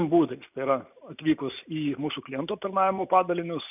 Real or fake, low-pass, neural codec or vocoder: real; 3.6 kHz; none